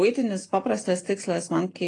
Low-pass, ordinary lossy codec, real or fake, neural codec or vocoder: 10.8 kHz; AAC, 32 kbps; real; none